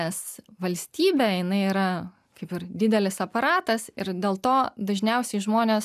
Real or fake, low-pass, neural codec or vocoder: real; 14.4 kHz; none